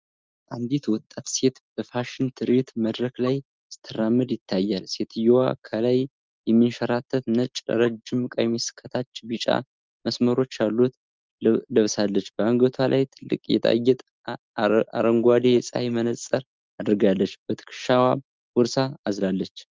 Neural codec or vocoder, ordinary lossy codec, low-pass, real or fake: none; Opus, 24 kbps; 7.2 kHz; real